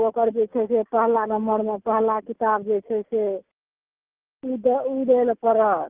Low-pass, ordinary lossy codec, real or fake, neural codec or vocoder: 3.6 kHz; Opus, 32 kbps; real; none